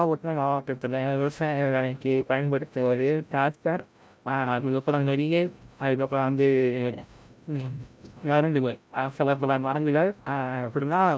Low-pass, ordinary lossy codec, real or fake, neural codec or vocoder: none; none; fake; codec, 16 kHz, 0.5 kbps, FreqCodec, larger model